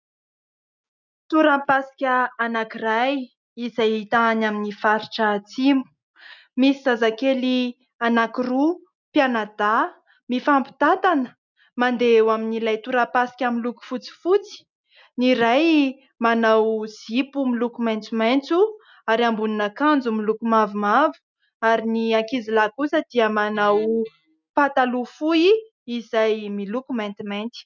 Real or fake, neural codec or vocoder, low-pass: real; none; 7.2 kHz